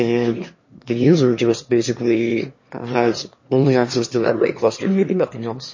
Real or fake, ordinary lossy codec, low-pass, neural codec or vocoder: fake; MP3, 32 kbps; 7.2 kHz; autoencoder, 22.05 kHz, a latent of 192 numbers a frame, VITS, trained on one speaker